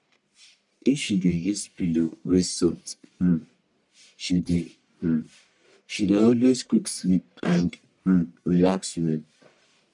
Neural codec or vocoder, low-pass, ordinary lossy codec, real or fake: codec, 44.1 kHz, 1.7 kbps, Pupu-Codec; 10.8 kHz; none; fake